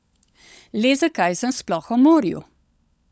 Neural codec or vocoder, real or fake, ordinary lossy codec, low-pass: codec, 16 kHz, 16 kbps, FunCodec, trained on LibriTTS, 50 frames a second; fake; none; none